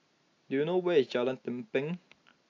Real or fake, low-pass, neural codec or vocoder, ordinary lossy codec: real; 7.2 kHz; none; AAC, 48 kbps